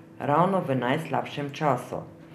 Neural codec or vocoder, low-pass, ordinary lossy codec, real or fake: none; 14.4 kHz; none; real